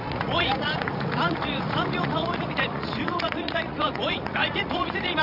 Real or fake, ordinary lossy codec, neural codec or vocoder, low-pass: fake; none; vocoder, 44.1 kHz, 80 mel bands, Vocos; 5.4 kHz